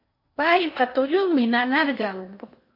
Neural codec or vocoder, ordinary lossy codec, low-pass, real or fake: codec, 16 kHz in and 24 kHz out, 0.8 kbps, FocalCodec, streaming, 65536 codes; MP3, 32 kbps; 5.4 kHz; fake